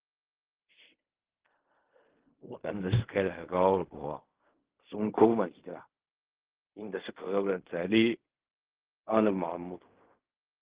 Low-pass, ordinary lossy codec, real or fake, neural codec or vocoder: 3.6 kHz; Opus, 16 kbps; fake; codec, 16 kHz in and 24 kHz out, 0.4 kbps, LongCat-Audio-Codec, fine tuned four codebook decoder